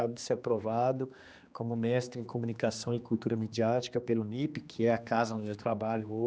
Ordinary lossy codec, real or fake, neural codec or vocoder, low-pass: none; fake; codec, 16 kHz, 2 kbps, X-Codec, HuBERT features, trained on general audio; none